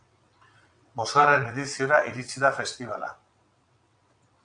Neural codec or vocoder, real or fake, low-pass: vocoder, 22.05 kHz, 80 mel bands, WaveNeXt; fake; 9.9 kHz